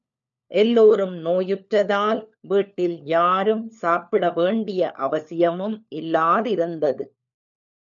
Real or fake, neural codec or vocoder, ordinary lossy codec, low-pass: fake; codec, 16 kHz, 4 kbps, FunCodec, trained on LibriTTS, 50 frames a second; MP3, 96 kbps; 7.2 kHz